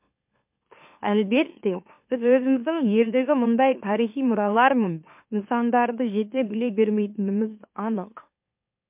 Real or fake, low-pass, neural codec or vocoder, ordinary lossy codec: fake; 3.6 kHz; autoencoder, 44.1 kHz, a latent of 192 numbers a frame, MeloTTS; MP3, 32 kbps